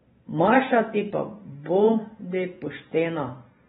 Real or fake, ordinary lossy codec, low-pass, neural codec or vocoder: fake; AAC, 16 kbps; 19.8 kHz; vocoder, 44.1 kHz, 128 mel bands every 512 samples, BigVGAN v2